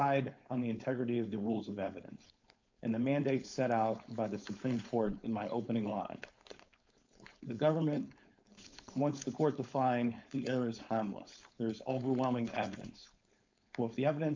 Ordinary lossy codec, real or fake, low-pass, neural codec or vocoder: MP3, 64 kbps; fake; 7.2 kHz; codec, 16 kHz, 4.8 kbps, FACodec